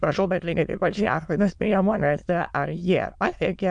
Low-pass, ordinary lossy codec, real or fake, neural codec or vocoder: 9.9 kHz; MP3, 96 kbps; fake; autoencoder, 22.05 kHz, a latent of 192 numbers a frame, VITS, trained on many speakers